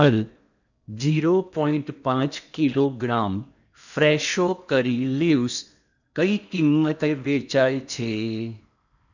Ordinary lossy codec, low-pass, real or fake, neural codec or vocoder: none; 7.2 kHz; fake; codec, 16 kHz in and 24 kHz out, 0.8 kbps, FocalCodec, streaming, 65536 codes